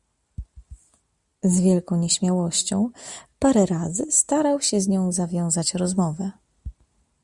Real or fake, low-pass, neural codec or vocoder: real; 10.8 kHz; none